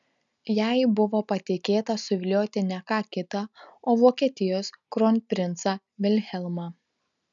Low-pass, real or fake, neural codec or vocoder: 7.2 kHz; real; none